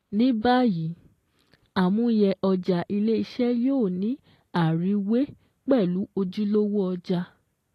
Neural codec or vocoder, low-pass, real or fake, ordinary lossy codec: none; 14.4 kHz; real; AAC, 48 kbps